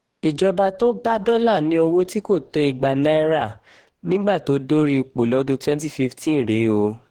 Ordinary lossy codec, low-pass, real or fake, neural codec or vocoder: Opus, 16 kbps; 19.8 kHz; fake; codec, 44.1 kHz, 2.6 kbps, DAC